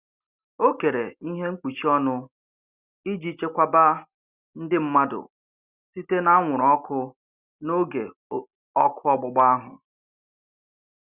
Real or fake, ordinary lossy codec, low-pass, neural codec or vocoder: real; Opus, 64 kbps; 3.6 kHz; none